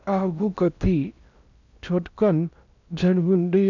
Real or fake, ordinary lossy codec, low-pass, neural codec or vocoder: fake; none; 7.2 kHz; codec, 16 kHz in and 24 kHz out, 0.6 kbps, FocalCodec, streaming, 4096 codes